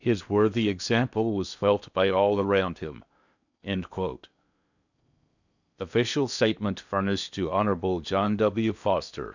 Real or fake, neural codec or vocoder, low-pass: fake; codec, 16 kHz in and 24 kHz out, 0.8 kbps, FocalCodec, streaming, 65536 codes; 7.2 kHz